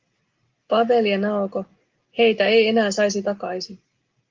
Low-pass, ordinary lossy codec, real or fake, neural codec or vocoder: 7.2 kHz; Opus, 32 kbps; real; none